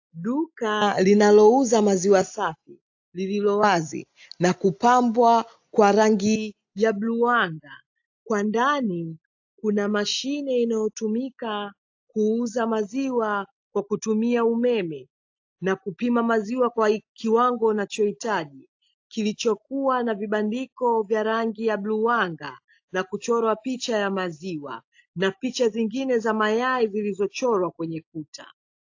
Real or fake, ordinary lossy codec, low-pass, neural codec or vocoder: real; AAC, 48 kbps; 7.2 kHz; none